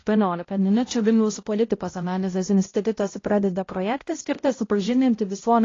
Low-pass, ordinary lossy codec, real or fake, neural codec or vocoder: 7.2 kHz; AAC, 32 kbps; fake; codec, 16 kHz, 0.5 kbps, X-Codec, HuBERT features, trained on balanced general audio